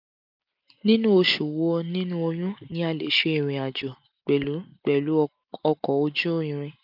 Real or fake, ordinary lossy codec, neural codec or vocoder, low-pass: real; none; none; 5.4 kHz